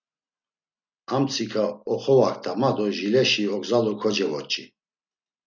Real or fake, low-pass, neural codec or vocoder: real; 7.2 kHz; none